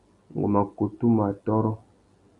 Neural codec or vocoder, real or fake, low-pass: none; real; 10.8 kHz